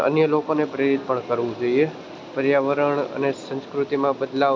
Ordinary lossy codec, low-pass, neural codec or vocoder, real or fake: none; none; none; real